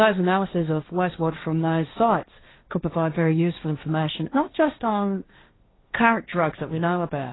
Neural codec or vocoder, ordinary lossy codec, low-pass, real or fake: codec, 16 kHz, 1.1 kbps, Voila-Tokenizer; AAC, 16 kbps; 7.2 kHz; fake